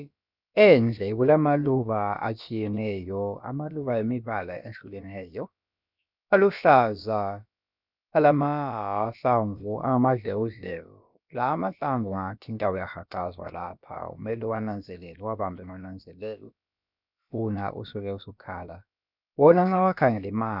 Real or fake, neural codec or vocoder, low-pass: fake; codec, 16 kHz, about 1 kbps, DyCAST, with the encoder's durations; 5.4 kHz